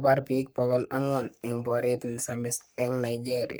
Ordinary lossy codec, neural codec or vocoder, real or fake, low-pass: none; codec, 44.1 kHz, 3.4 kbps, Pupu-Codec; fake; none